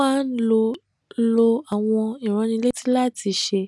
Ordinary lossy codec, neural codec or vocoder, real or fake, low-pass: none; none; real; none